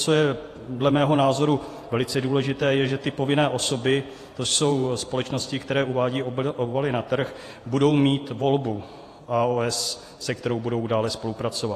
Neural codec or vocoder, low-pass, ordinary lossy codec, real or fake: vocoder, 48 kHz, 128 mel bands, Vocos; 14.4 kHz; AAC, 48 kbps; fake